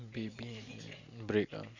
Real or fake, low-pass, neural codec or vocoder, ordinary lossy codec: real; 7.2 kHz; none; Opus, 64 kbps